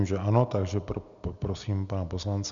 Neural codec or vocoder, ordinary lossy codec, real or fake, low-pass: none; MP3, 96 kbps; real; 7.2 kHz